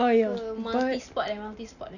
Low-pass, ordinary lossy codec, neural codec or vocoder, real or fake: 7.2 kHz; none; none; real